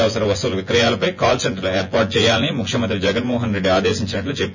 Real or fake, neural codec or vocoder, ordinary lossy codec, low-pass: fake; vocoder, 24 kHz, 100 mel bands, Vocos; none; 7.2 kHz